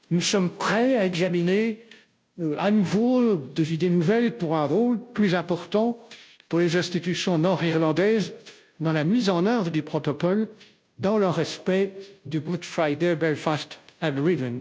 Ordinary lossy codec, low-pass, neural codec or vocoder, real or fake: none; none; codec, 16 kHz, 0.5 kbps, FunCodec, trained on Chinese and English, 25 frames a second; fake